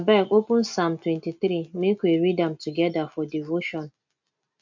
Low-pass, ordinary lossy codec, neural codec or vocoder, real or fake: 7.2 kHz; MP3, 48 kbps; none; real